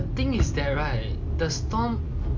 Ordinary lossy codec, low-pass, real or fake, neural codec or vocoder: MP3, 48 kbps; 7.2 kHz; real; none